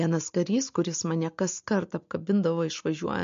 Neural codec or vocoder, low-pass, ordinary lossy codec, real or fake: none; 7.2 kHz; MP3, 48 kbps; real